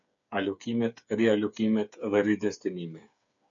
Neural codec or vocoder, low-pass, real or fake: codec, 16 kHz, 8 kbps, FreqCodec, smaller model; 7.2 kHz; fake